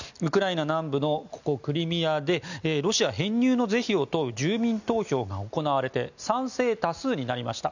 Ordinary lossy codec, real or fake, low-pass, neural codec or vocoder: none; real; 7.2 kHz; none